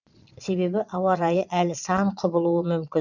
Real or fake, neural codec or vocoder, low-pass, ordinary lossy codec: fake; vocoder, 22.05 kHz, 80 mel bands, Vocos; 7.2 kHz; none